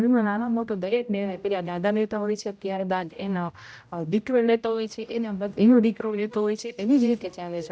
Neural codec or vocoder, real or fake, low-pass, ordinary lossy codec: codec, 16 kHz, 0.5 kbps, X-Codec, HuBERT features, trained on general audio; fake; none; none